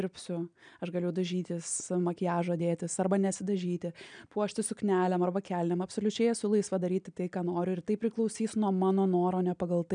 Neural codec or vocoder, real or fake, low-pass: none; real; 9.9 kHz